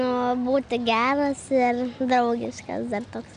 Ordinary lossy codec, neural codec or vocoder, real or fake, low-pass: Opus, 64 kbps; none; real; 9.9 kHz